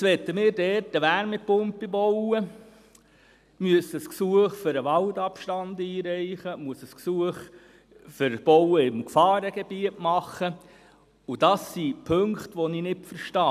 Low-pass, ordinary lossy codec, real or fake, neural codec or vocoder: 14.4 kHz; none; real; none